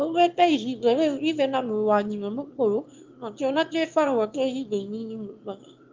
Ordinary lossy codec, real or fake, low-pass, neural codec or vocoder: Opus, 24 kbps; fake; 7.2 kHz; autoencoder, 22.05 kHz, a latent of 192 numbers a frame, VITS, trained on one speaker